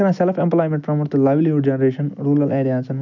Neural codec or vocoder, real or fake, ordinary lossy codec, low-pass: none; real; none; 7.2 kHz